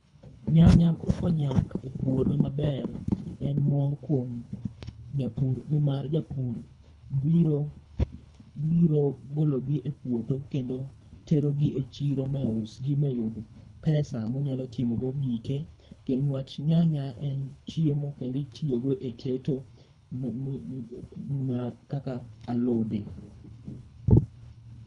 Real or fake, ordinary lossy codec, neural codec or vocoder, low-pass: fake; none; codec, 24 kHz, 3 kbps, HILCodec; 10.8 kHz